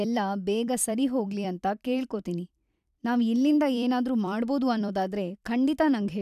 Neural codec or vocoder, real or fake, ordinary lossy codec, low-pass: vocoder, 44.1 kHz, 128 mel bands every 256 samples, BigVGAN v2; fake; none; 14.4 kHz